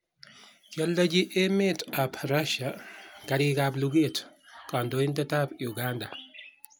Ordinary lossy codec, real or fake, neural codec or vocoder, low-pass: none; real; none; none